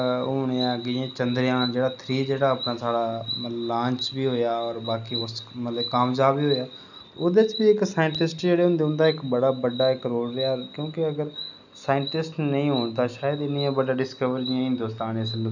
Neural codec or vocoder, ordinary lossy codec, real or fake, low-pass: none; none; real; 7.2 kHz